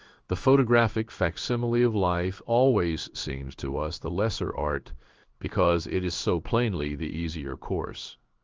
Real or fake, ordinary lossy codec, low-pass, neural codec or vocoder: fake; Opus, 24 kbps; 7.2 kHz; codec, 16 kHz in and 24 kHz out, 1 kbps, XY-Tokenizer